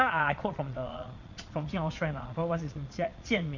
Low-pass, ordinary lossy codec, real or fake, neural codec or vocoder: 7.2 kHz; Opus, 64 kbps; fake; vocoder, 22.05 kHz, 80 mel bands, Vocos